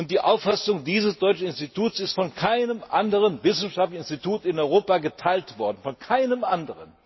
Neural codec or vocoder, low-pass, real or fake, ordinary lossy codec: none; 7.2 kHz; real; MP3, 24 kbps